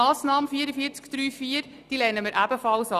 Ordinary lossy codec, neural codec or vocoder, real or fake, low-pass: none; none; real; 14.4 kHz